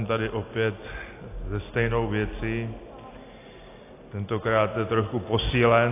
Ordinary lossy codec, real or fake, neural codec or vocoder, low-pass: AAC, 24 kbps; real; none; 3.6 kHz